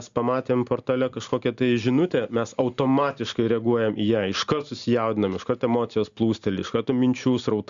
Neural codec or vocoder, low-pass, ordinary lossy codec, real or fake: none; 7.2 kHz; Opus, 64 kbps; real